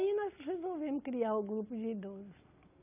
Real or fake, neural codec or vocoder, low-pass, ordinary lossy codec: real; none; 3.6 kHz; none